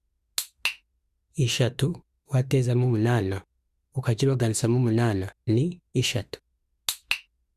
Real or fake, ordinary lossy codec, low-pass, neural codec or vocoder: fake; Opus, 64 kbps; 14.4 kHz; autoencoder, 48 kHz, 32 numbers a frame, DAC-VAE, trained on Japanese speech